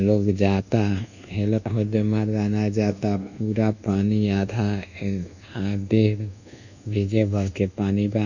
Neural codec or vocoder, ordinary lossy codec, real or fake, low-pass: codec, 24 kHz, 1.2 kbps, DualCodec; none; fake; 7.2 kHz